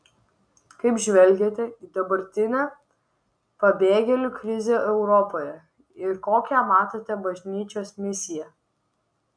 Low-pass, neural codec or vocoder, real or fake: 9.9 kHz; none; real